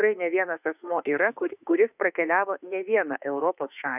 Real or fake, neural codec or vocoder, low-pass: fake; autoencoder, 48 kHz, 32 numbers a frame, DAC-VAE, trained on Japanese speech; 3.6 kHz